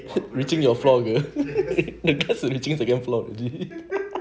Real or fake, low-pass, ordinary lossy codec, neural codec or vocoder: real; none; none; none